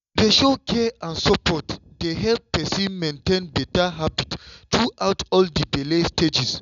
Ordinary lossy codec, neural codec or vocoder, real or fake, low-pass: none; none; real; 7.2 kHz